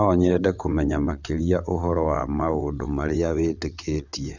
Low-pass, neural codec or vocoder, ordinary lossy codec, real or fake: 7.2 kHz; vocoder, 22.05 kHz, 80 mel bands, WaveNeXt; none; fake